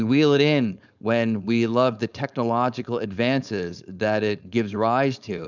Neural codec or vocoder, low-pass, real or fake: codec, 16 kHz, 4.8 kbps, FACodec; 7.2 kHz; fake